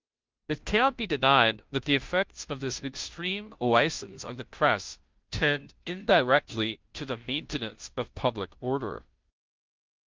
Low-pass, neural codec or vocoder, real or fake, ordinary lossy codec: 7.2 kHz; codec, 16 kHz, 0.5 kbps, FunCodec, trained on Chinese and English, 25 frames a second; fake; Opus, 24 kbps